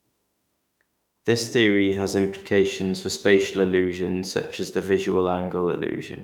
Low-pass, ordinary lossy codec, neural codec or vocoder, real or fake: 19.8 kHz; none; autoencoder, 48 kHz, 32 numbers a frame, DAC-VAE, trained on Japanese speech; fake